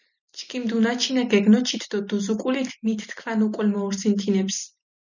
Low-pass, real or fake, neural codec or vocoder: 7.2 kHz; real; none